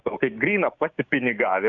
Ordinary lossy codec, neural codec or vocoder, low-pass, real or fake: AAC, 64 kbps; codec, 16 kHz, 6 kbps, DAC; 7.2 kHz; fake